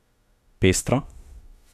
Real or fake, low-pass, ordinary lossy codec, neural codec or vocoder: fake; 14.4 kHz; none; autoencoder, 48 kHz, 32 numbers a frame, DAC-VAE, trained on Japanese speech